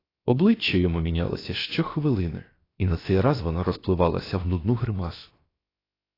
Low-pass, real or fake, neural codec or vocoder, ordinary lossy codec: 5.4 kHz; fake; codec, 16 kHz, about 1 kbps, DyCAST, with the encoder's durations; AAC, 24 kbps